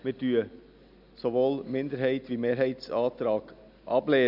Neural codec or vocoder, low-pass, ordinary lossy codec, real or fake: none; 5.4 kHz; none; real